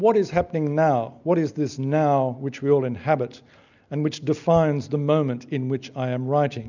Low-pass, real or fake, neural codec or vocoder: 7.2 kHz; real; none